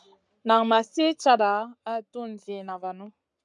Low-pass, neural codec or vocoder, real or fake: 10.8 kHz; codec, 44.1 kHz, 7.8 kbps, Pupu-Codec; fake